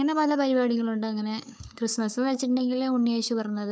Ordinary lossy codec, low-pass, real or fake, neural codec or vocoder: none; none; fake; codec, 16 kHz, 4 kbps, FunCodec, trained on Chinese and English, 50 frames a second